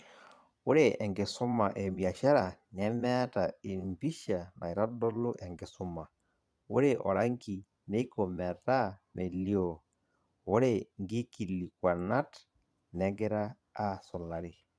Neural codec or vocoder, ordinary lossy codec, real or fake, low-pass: vocoder, 22.05 kHz, 80 mel bands, Vocos; none; fake; none